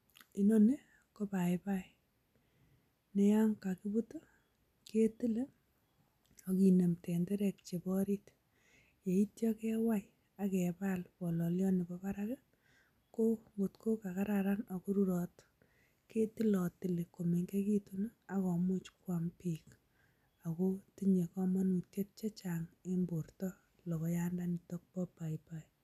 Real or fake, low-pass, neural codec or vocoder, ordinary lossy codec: real; 14.4 kHz; none; none